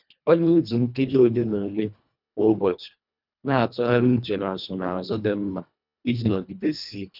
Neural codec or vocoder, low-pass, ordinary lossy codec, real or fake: codec, 24 kHz, 1.5 kbps, HILCodec; 5.4 kHz; none; fake